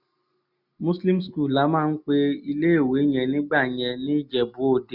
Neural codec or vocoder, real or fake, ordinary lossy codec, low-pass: none; real; none; 5.4 kHz